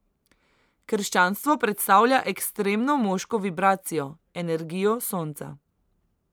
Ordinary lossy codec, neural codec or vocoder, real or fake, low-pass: none; vocoder, 44.1 kHz, 128 mel bands every 512 samples, BigVGAN v2; fake; none